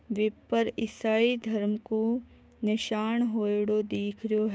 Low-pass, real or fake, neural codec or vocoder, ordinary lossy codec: none; fake; codec, 16 kHz, 6 kbps, DAC; none